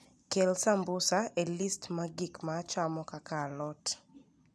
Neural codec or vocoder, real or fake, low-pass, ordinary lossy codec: none; real; none; none